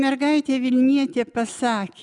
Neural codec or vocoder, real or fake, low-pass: none; real; 10.8 kHz